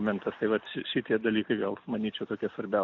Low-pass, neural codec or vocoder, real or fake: 7.2 kHz; none; real